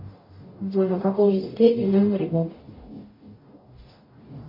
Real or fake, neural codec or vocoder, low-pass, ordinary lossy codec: fake; codec, 44.1 kHz, 0.9 kbps, DAC; 5.4 kHz; MP3, 24 kbps